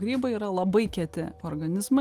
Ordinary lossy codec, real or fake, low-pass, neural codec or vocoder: Opus, 24 kbps; real; 14.4 kHz; none